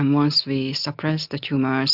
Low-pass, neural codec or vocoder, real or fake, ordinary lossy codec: 5.4 kHz; none; real; AAC, 48 kbps